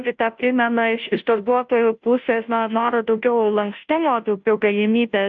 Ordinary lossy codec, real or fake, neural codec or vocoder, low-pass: AAC, 64 kbps; fake; codec, 16 kHz, 0.5 kbps, FunCodec, trained on Chinese and English, 25 frames a second; 7.2 kHz